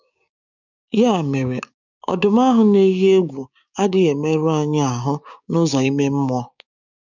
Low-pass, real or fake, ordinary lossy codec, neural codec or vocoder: 7.2 kHz; fake; none; codec, 16 kHz, 6 kbps, DAC